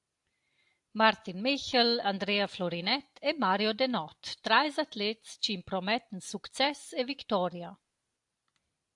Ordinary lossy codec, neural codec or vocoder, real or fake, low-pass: AAC, 64 kbps; none; real; 10.8 kHz